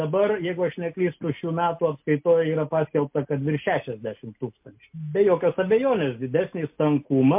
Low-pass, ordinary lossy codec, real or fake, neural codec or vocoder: 3.6 kHz; MP3, 32 kbps; real; none